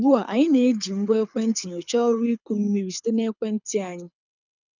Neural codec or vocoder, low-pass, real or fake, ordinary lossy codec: codec, 24 kHz, 6 kbps, HILCodec; 7.2 kHz; fake; none